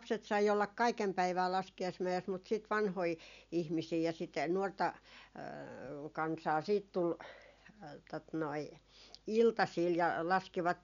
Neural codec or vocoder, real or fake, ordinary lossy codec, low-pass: none; real; none; 7.2 kHz